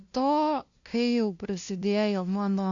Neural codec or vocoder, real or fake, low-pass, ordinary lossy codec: codec, 16 kHz, 0.5 kbps, FunCodec, trained on LibriTTS, 25 frames a second; fake; 7.2 kHz; AAC, 64 kbps